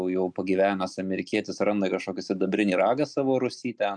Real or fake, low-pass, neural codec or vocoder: real; 9.9 kHz; none